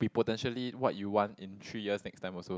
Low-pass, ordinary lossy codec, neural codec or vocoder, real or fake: none; none; none; real